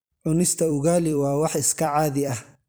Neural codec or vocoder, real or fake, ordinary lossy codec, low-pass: vocoder, 44.1 kHz, 128 mel bands every 256 samples, BigVGAN v2; fake; none; none